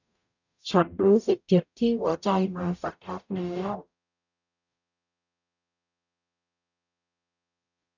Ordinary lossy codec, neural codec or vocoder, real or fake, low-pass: none; codec, 44.1 kHz, 0.9 kbps, DAC; fake; 7.2 kHz